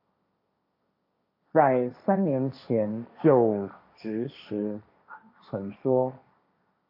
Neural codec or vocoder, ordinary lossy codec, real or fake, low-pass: codec, 16 kHz, 1.1 kbps, Voila-Tokenizer; MP3, 32 kbps; fake; 5.4 kHz